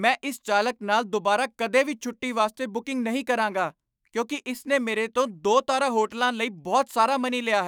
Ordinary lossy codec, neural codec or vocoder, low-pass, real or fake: none; autoencoder, 48 kHz, 128 numbers a frame, DAC-VAE, trained on Japanese speech; none; fake